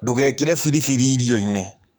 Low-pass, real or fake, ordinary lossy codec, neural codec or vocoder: none; fake; none; codec, 44.1 kHz, 2.6 kbps, SNAC